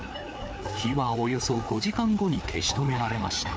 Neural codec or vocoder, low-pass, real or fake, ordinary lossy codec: codec, 16 kHz, 4 kbps, FreqCodec, larger model; none; fake; none